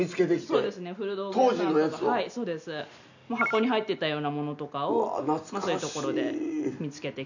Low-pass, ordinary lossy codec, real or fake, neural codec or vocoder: 7.2 kHz; none; real; none